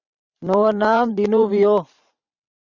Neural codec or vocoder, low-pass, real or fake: vocoder, 44.1 kHz, 128 mel bands every 512 samples, BigVGAN v2; 7.2 kHz; fake